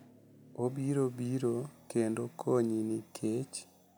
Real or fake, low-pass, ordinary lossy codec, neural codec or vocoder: real; none; none; none